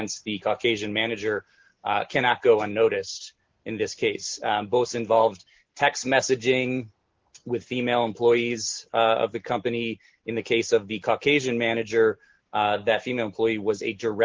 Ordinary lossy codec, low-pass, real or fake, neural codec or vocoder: Opus, 16 kbps; 7.2 kHz; real; none